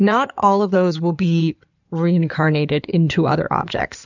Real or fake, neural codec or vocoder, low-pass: fake; codec, 16 kHz in and 24 kHz out, 2.2 kbps, FireRedTTS-2 codec; 7.2 kHz